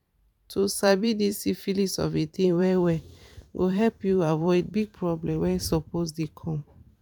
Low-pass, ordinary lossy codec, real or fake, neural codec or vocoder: 19.8 kHz; none; fake; vocoder, 44.1 kHz, 128 mel bands every 512 samples, BigVGAN v2